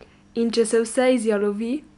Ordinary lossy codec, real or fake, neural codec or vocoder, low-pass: none; real; none; 10.8 kHz